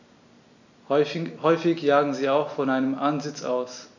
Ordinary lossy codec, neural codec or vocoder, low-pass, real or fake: none; none; 7.2 kHz; real